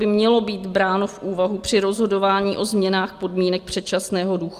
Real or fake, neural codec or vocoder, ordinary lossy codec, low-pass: real; none; Opus, 32 kbps; 14.4 kHz